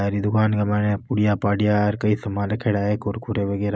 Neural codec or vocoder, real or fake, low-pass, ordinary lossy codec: none; real; none; none